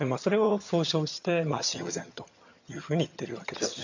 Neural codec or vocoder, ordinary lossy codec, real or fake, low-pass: vocoder, 22.05 kHz, 80 mel bands, HiFi-GAN; none; fake; 7.2 kHz